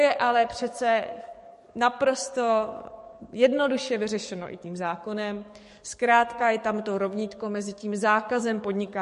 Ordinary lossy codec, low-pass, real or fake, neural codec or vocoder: MP3, 48 kbps; 14.4 kHz; fake; codec, 44.1 kHz, 7.8 kbps, DAC